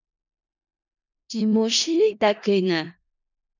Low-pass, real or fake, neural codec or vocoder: 7.2 kHz; fake; codec, 16 kHz in and 24 kHz out, 0.4 kbps, LongCat-Audio-Codec, four codebook decoder